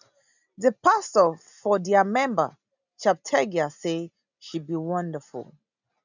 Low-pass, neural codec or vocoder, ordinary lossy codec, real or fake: 7.2 kHz; none; none; real